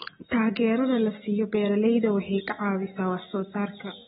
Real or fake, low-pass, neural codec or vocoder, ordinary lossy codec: real; 19.8 kHz; none; AAC, 16 kbps